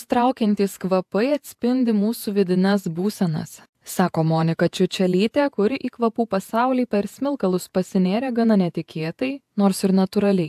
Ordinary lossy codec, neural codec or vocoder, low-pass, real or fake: AAC, 96 kbps; vocoder, 48 kHz, 128 mel bands, Vocos; 14.4 kHz; fake